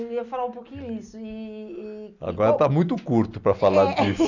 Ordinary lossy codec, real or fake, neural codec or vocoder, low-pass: none; real; none; 7.2 kHz